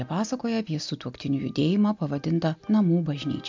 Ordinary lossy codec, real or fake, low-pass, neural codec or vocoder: MP3, 64 kbps; real; 7.2 kHz; none